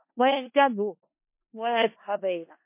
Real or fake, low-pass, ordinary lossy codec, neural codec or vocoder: fake; 3.6 kHz; MP3, 24 kbps; codec, 16 kHz in and 24 kHz out, 0.4 kbps, LongCat-Audio-Codec, four codebook decoder